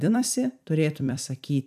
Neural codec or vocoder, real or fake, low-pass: vocoder, 44.1 kHz, 128 mel bands every 256 samples, BigVGAN v2; fake; 14.4 kHz